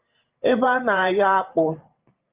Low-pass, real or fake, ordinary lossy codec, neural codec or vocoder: 3.6 kHz; fake; Opus, 32 kbps; vocoder, 44.1 kHz, 128 mel bands every 512 samples, BigVGAN v2